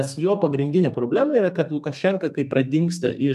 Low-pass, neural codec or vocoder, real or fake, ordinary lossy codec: 14.4 kHz; codec, 32 kHz, 1.9 kbps, SNAC; fake; MP3, 96 kbps